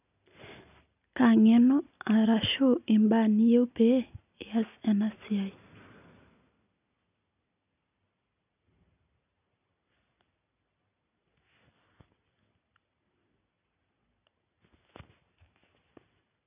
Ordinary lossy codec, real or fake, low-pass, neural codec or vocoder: none; real; 3.6 kHz; none